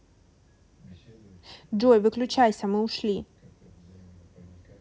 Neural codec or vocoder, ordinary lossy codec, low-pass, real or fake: none; none; none; real